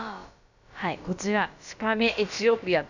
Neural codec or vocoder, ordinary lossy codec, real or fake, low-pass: codec, 16 kHz, about 1 kbps, DyCAST, with the encoder's durations; none; fake; 7.2 kHz